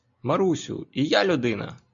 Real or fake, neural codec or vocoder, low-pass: real; none; 7.2 kHz